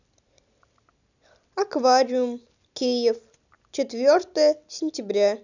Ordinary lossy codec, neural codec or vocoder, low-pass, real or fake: none; none; 7.2 kHz; real